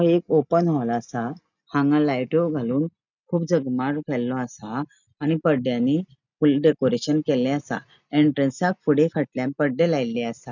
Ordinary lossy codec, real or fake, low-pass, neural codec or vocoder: none; real; 7.2 kHz; none